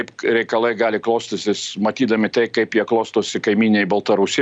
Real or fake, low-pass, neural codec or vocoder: real; 9.9 kHz; none